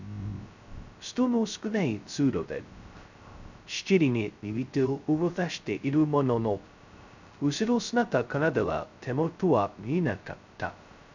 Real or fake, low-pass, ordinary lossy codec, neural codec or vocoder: fake; 7.2 kHz; none; codec, 16 kHz, 0.2 kbps, FocalCodec